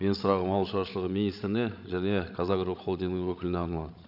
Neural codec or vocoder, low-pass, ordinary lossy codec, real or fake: codec, 16 kHz, 16 kbps, FreqCodec, larger model; 5.4 kHz; none; fake